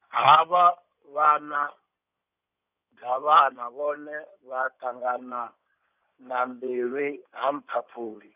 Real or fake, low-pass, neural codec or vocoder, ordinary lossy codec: fake; 3.6 kHz; codec, 16 kHz in and 24 kHz out, 2.2 kbps, FireRedTTS-2 codec; none